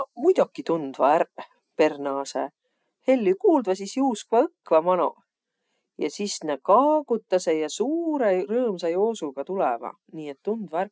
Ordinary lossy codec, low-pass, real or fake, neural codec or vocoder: none; none; real; none